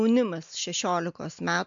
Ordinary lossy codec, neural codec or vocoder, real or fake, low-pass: MP3, 64 kbps; none; real; 7.2 kHz